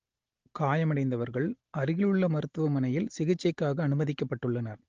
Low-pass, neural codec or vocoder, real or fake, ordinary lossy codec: 7.2 kHz; none; real; Opus, 16 kbps